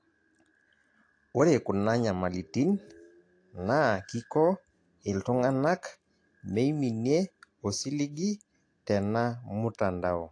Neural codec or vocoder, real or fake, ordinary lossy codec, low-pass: none; real; AAC, 48 kbps; 9.9 kHz